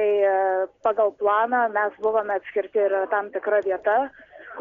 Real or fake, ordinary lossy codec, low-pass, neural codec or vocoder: real; MP3, 64 kbps; 7.2 kHz; none